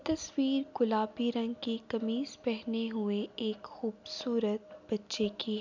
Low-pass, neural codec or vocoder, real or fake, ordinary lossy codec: 7.2 kHz; none; real; MP3, 64 kbps